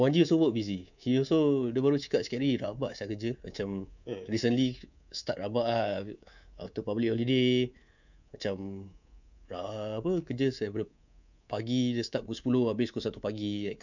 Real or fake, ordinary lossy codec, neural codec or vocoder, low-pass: fake; none; codec, 24 kHz, 3.1 kbps, DualCodec; 7.2 kHz